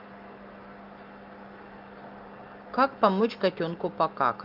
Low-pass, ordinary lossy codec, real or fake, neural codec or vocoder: 5.4 kHz; Opus, 32 kbps; real; none